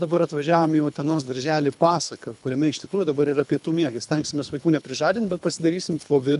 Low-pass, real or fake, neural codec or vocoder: 10.8 kHz; fake; codec, 24 kHz, 3 kbps, HILCodec